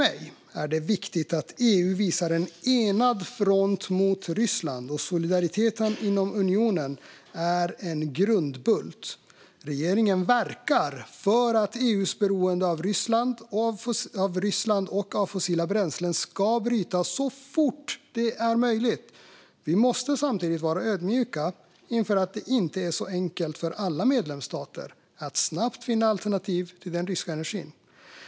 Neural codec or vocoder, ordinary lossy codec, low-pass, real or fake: none; none; none; real